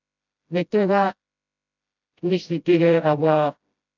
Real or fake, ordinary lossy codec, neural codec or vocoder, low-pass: fake; none; codec, 16 kHz, 0.5 kbps, FreqCodec, smaller model; 7.2 kHz